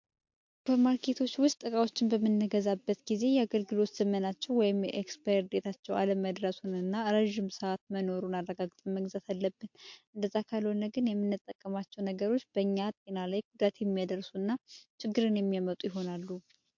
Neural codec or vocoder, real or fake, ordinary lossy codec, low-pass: none; real; MP3, 48 kbps; 7.2 kHz